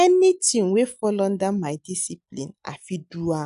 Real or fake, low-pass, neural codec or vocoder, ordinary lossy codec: real; 10.8 kHz; none; none